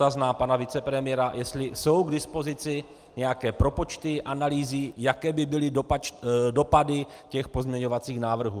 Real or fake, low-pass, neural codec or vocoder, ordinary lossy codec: real; 14.4 kHz; none; Opus, 32 kbps